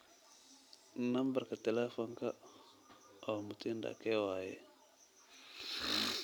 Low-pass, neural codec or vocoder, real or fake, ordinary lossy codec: none; none; real; none